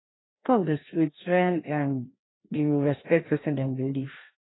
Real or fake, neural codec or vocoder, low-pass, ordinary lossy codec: fake; codec, 16 kHz, 1 kbps, FreqCodec, larger model; 7.2 kHz; AAC, 16 kbps